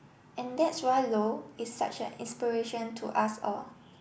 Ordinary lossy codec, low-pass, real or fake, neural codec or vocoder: none; none; real; none